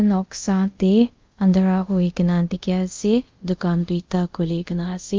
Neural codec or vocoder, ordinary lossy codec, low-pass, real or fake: codec, 24 kHz, 0.5 kbps, DualCodec; Opus, 32 kbps; 7.2 kHz; fake